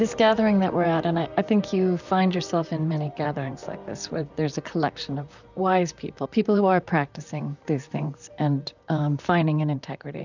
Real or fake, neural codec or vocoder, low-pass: fake; vocoder, 44.1 kHz, 128 mel bands, Pupu-Vocoder; 7.2 kHz